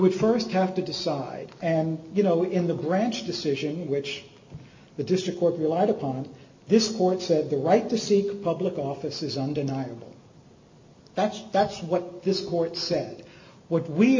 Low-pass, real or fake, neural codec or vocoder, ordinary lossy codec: 7.2 kHz; real; none; MP3, 48 kbps